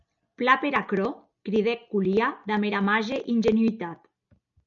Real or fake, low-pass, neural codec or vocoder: real; 7.2 kHz; none